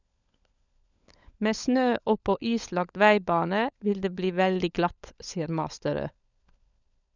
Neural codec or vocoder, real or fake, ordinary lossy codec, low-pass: codec, 16 kHz, 16 kbps, FunCodec, trained on LibriTTS, 50 frames a second; fake; none; 7.2 kHz